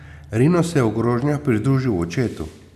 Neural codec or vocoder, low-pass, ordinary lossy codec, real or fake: none; 14.4 kHz; none; real